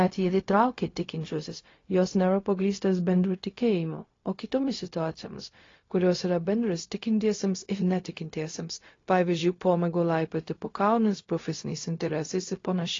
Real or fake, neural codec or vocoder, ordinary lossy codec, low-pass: fake; codec, 16 kHz, 0.4 kbps, LongCat-Audio-Codec; AAC, 32 kbps; 7.2 kHz